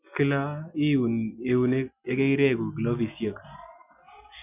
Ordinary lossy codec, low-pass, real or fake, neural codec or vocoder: none; 3.6 kHz; real; none